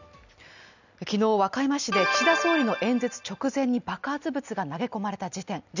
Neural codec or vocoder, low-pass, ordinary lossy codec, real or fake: none; 7.2 kHz; none; real